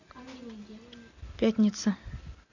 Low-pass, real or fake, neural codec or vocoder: 7.2 kHz; real; none